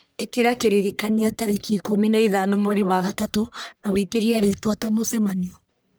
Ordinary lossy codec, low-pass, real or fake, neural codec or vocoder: none; none; fake; codec, 44.1 kHz, 1.7 kbps, Pupu-Codec